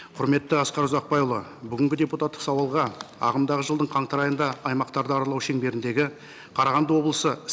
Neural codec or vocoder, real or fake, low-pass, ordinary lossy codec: none; real; none; none